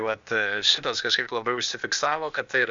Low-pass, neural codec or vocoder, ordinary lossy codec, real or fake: 7.2 kHz; codec, 16 kHz, 0.8 kbps, ZipCodec; Opus, 64 kbps; fake